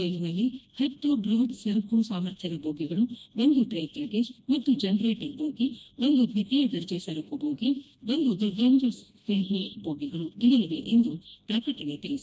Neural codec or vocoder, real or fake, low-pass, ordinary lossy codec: codec, 16 kHz, 1 kbps, FreqCodec, smaller model; fake; none; none